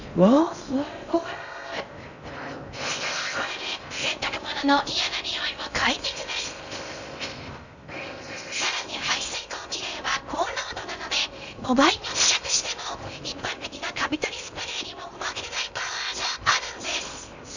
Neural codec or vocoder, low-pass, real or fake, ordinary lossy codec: codec, 16 kHz in and 24 kHz out, 0.6 kbps, FocalCodec, streaming, 4096 codes; 7.2 kHz; fake; none